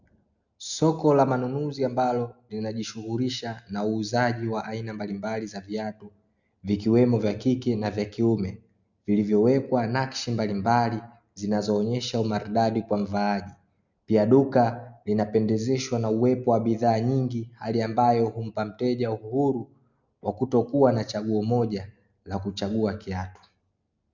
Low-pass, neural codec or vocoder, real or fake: 7.2 kHz; none; real